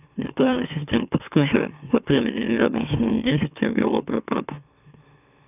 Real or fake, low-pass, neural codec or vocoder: fake; 3.6 kHz; autoencoder, 44.1 kHz, a latent of 192 numbers a frame, MeloTTS